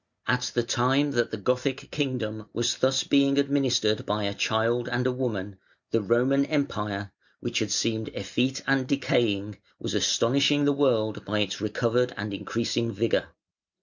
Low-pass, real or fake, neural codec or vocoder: 7.2 kHz; real; none